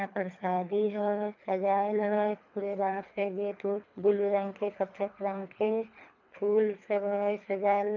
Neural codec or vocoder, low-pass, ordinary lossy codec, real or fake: codec, 24 kHz, 3 kbps, HILCodec; 7.2 kHz; MP3, 64 kbps; fake